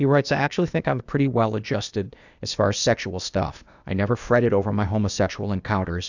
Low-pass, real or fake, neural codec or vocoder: 7.2 kHz; fake; codec, 16 kHz, 0.8 kbps, ZipCodec